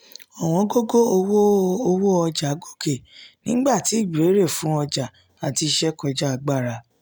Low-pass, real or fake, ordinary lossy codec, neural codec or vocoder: none; real; none; none